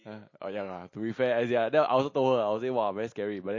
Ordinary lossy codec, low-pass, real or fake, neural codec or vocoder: MP3, 32 kbps; 7.2 kHz; real; none